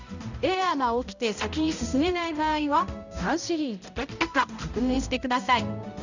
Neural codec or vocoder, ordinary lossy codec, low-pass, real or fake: codec, 16 kHz, 0.5 kbps, X-Codec, HuBERT features, trained on balanced general audio; none; 7.2 kHz; fake